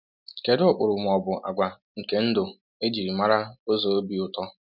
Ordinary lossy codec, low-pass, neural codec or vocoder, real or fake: none; 5.4 kHz; none; real